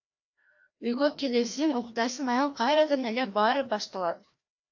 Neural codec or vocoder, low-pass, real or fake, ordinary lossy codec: codec, 16 kHz, 1 kbps, FreqCodec, larger model; 7.2 kHz; fake; AAC, 48 kbps